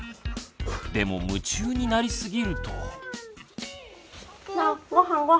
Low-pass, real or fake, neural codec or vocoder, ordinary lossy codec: none; real; none; none